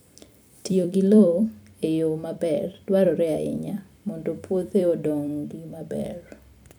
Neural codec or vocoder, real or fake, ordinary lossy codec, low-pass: vocoder, 44.1 kHz, 128 mel bands every 256 samples, BigVGAN v2; fake; none; none